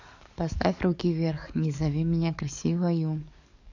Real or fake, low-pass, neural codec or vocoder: fake; 7.2 kHz; codec, 44.1 kHz, 7.8 kbps, DAC